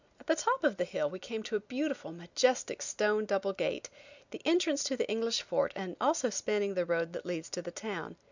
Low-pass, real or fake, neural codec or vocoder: 7.2 kHz; real; none